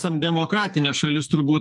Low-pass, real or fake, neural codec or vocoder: 10.8 kHz; fake; codec, 44.1 kHz, 2.6 kbps, SNAC